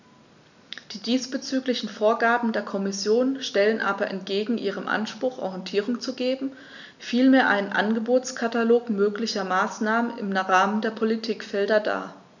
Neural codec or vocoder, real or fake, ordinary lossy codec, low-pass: none; real; none; 7.2 kHz